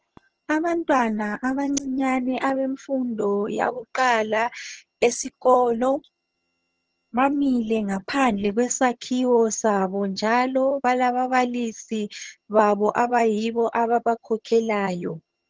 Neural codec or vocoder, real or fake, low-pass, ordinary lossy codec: vocoder, 22.05 kHz, 80 mel bands, HiFi-GAN; fake; 7.2 kHz; Opus, 16 kbps